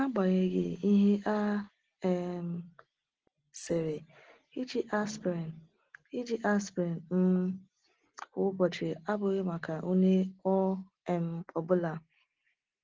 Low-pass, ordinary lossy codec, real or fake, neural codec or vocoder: 7.2 kHz; Opus, 16 kbps; real; none